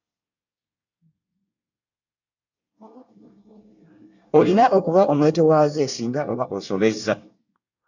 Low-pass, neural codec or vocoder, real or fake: 7.2 kHz; codec, 24 kHz, 1 kbps, SNAC; fake